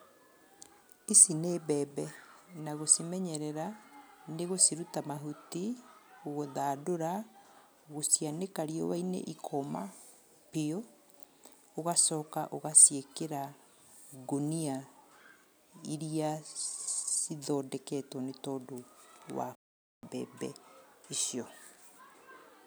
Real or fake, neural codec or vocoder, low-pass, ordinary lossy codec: real; none; none; none